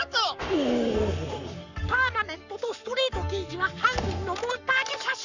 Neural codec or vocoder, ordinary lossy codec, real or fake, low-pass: codec, 44.1 kHz, 7.8 kbps, Pupu-Codec; none; fake; 7.2 kHz